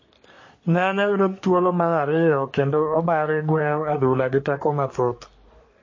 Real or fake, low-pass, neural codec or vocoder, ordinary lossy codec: fake; 7.2 kHz; codec, 44.1 kHz, 3.4 kbps, Pupu-Codec; MP3, 32 kbps